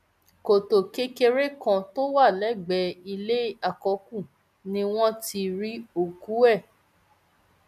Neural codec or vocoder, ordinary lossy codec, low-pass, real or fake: none; none; 14.4 kHz; real